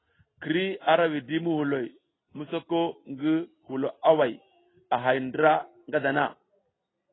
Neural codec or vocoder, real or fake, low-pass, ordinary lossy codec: none; real; 7.2 kHz; AAC, 16 kbps